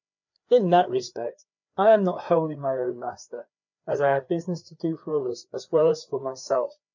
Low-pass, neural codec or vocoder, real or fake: 7.2 kHz; codec, 16 kHz, 4 kbps, FreqCodec, larger model; fake